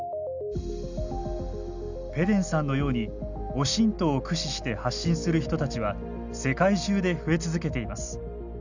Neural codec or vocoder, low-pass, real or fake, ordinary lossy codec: none; 7.2 kHz; real; none